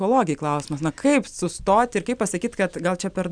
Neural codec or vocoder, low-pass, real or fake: none; 9.9 kHz; real